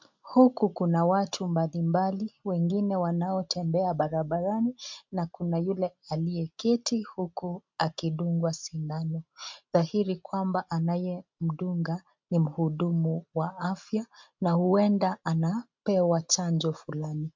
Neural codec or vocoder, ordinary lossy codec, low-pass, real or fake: none; MP3, 64 kbps; 7.2 kHz; real